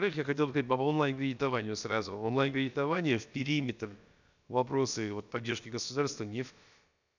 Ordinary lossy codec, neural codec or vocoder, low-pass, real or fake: none; codec, 16 kHz, about 1 kbps, DyCAST, with the encoder's durations; 7.2 kHz; fake